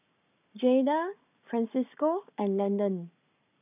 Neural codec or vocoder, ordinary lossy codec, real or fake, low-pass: codec, 44.1 kHz, 7.8 kbps, Pupu-Codec; none; fake; 3.6 kHz